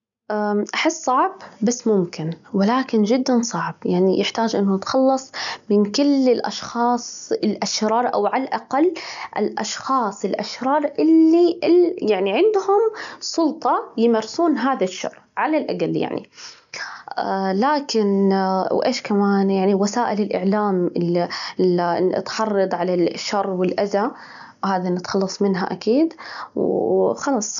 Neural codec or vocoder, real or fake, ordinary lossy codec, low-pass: none; real; none; 7.2 kHz